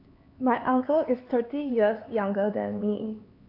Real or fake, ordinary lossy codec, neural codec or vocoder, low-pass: fake; AAC, 32 kbps; codec, 16 kHz, 4 kbps, X-Codec, HuBERT features, trained on LibriSpeech; 5.4 kHz